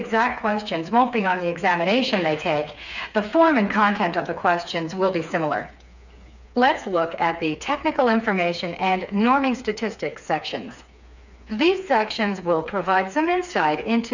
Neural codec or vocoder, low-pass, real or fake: codec, 16 kHz, 4 kbps, FreqCodec, smaller model; 7.2 kHz; fake